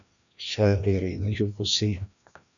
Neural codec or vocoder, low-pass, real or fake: codec, 16 kHz, 1 kbps, FreqCodec, larger model; 7.2 kHz; fake